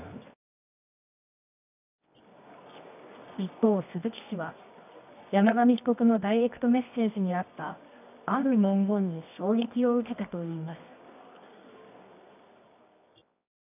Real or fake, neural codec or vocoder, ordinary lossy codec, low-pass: fake; codec, 24 kHz, 0.9 kbps, WavTokenizer, medium music audio release; none; 3.6 kHz